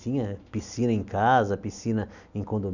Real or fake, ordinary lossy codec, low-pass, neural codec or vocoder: real; none; 7.2 kHz; none